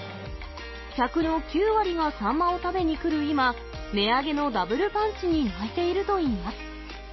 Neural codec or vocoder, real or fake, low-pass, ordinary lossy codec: none; real; 7.2 kHz; MP3, 24 kbps